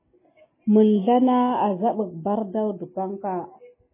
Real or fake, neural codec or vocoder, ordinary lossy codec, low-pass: real; none; MP3, 16 kbps; 3.6 kHz